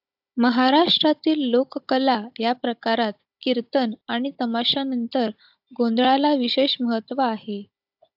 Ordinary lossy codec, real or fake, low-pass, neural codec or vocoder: AAC, 48 kbps; fake; 5.4 kHz; codec, 16 kHz, 16 kbps, FunCodec, trained on Chinese and English, 50 frames a second